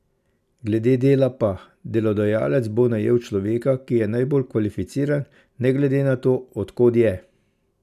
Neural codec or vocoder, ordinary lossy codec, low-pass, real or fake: none; none; 14.4 kHz; real